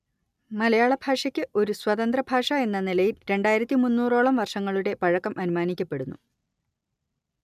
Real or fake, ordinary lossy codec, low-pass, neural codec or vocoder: real; none; 14.4 kHz; none